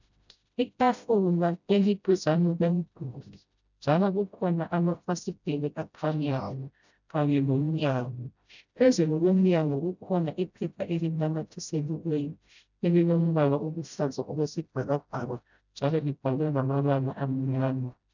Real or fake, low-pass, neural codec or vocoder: fake; 7.2 kHz; codec, 16 kHz, 0.5 kbps, FreqCodec, smaller model